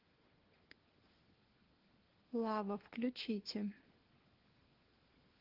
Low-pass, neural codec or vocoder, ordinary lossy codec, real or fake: 5.4 kHz; codec, 16 kHz, 4 kbps, FunCodec, trained on LibriTTS, 50 frames a second; Opus, 16 kbps; fake